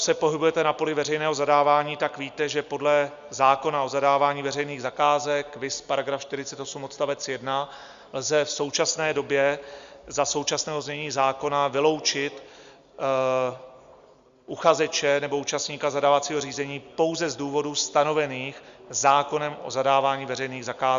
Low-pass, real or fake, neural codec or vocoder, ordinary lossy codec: 7.2 kHz; real; none; Opus, 64 kbps